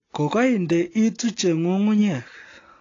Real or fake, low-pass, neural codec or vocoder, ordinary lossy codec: real; 7.2 kHz; none; AAC, 32 kbps